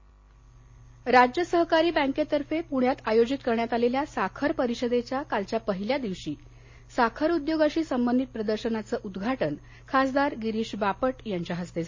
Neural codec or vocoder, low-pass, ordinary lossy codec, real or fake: none; 7.2 kHz; MP3, 32 kbps; real